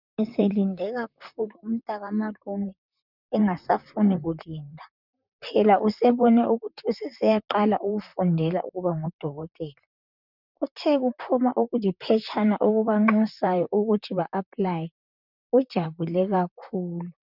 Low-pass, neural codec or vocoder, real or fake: 5.4 kHz; vocoder, 44.1 kHz, 128 mel bands, Pupu-Vocoder; fake